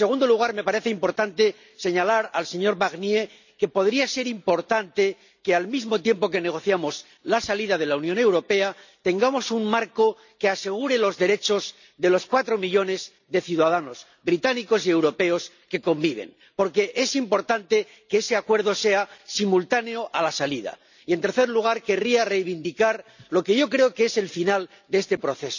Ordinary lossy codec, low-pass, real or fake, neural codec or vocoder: none; 7.2 kHz; real; none